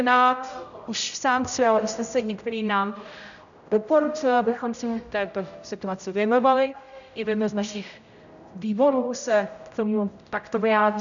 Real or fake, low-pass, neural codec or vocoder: fake; 7.2 kHz; codec, 16 kHz, 0.5 kbps, X-Codec, HuBERT features, trained on general audio